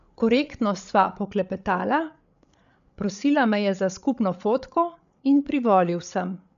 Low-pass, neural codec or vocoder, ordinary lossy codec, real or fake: 7.2 kHz; codec, 16 kHz, 8 kbps, FreqCodec, larger model; none; fake